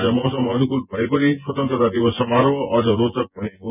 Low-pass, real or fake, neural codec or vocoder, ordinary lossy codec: 3.6 kHz; fake; vocoder, 24 kHz, 100 mel bands, Vocos; none